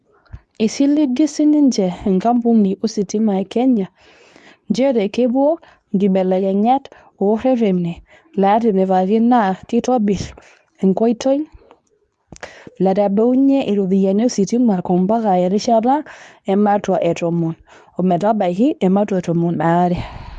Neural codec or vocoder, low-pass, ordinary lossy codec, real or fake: codec, 24 kHz, 0.9 kbps, WavTokenizer, medium speech release version 2; none; none; fake